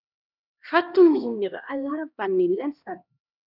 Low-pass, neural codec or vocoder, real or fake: 5.4 kHz; codec, 16 kHz, 1 kbps, X-Codec, HuBERT features, trained on LibriSpeech; fake